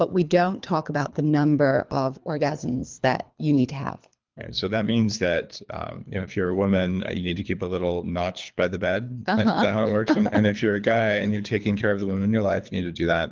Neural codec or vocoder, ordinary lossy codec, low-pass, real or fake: codec, 24 kHz, 3 kbps, HILCodec; Opus, 32 kbps; 7.2 kHz; fake